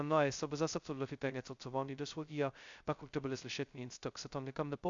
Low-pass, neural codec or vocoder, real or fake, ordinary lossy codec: 7.2 kHz; codec, 16 kHz, 0.2 kbps, FocalCodec; fake; Opus, 64 kbps